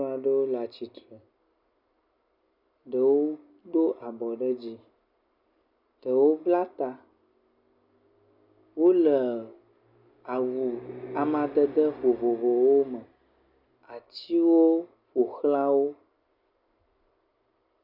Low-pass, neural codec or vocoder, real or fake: 5.4 kHz; none; real